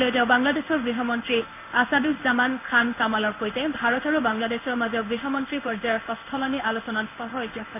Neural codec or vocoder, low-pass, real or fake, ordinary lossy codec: codec, 16 kHz in and 24 kHz out, 1 kbps, XY-Tokenizer; 3.6 kHz; fake; none